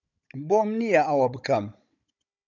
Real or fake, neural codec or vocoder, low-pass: fake; codec, 16 kHz, 16 kbps, FunCodec, trained on Chinese and English, 50 frames a second; 7.2 kHz